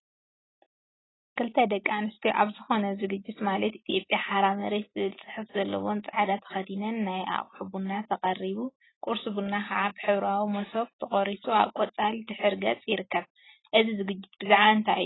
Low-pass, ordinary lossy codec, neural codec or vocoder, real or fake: 7.2 kHz; AAC, 16 kbps; none; real